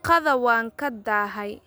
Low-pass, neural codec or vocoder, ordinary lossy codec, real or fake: none; none; none; real